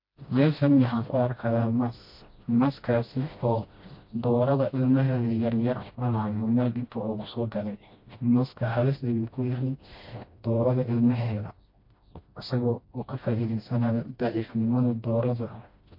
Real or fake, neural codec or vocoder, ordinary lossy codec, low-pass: fake; codec, 16 kHz, 1 kbps, FreqCodec, smaller model; MP3, 32 kbps; 5.4 kHz